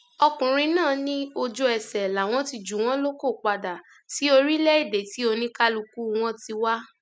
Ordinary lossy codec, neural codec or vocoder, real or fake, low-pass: none; none; real; none